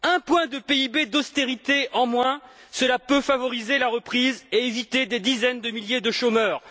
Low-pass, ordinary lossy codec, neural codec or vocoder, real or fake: none; none; none; real